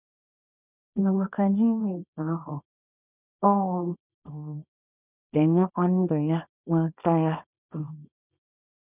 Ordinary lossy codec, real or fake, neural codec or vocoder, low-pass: none; fake; codec, 24 kHz, 0.9 kbps, WavTokenizer, small release; 3.6 kHz